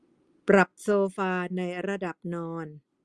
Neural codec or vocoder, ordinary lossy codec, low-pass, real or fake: none; Opus, 32 kbps; 10.8 kHz; real